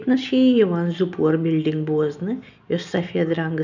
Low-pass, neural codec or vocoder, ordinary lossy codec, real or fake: 7.2 kHz; none; none; real